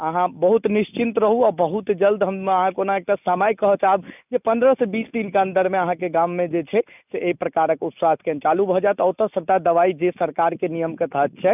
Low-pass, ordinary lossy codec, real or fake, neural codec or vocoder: 3.6 kHz; none; real; none